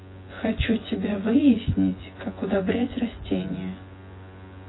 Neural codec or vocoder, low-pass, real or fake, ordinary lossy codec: vocoder, 24 kHz, 100 mel bands, Vocos; 7.2 kHz; fake; AAC, 16 kbps